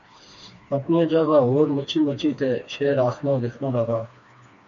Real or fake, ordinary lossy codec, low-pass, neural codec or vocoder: fake; MP3, 48 kbps; 7.2 kHz; codec, 16 kHz, 2 kbps, FreqCodec, smaller model